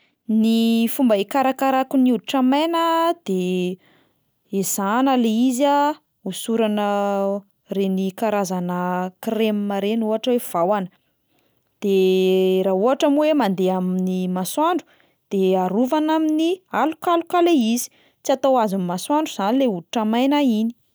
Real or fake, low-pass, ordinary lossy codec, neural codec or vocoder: real; none; none; none